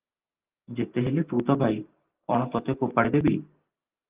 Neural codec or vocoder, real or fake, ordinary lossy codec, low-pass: none; real; Opus, 32 kbps; 3.6 kHz